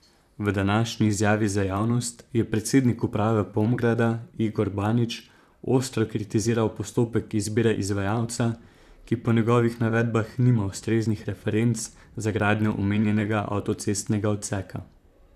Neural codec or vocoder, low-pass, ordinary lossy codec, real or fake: vocoder, 44.1 kHz, 128 mel bands, Pupu-Vocoder; 14.4 kHz; none; fake